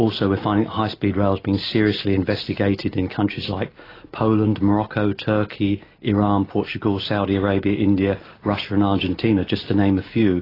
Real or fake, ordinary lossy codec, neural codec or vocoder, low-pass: real; AAC, 24 kbps; none; 5.4 kHz